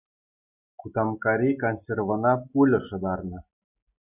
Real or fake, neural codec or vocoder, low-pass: real; none; 3.6 kHz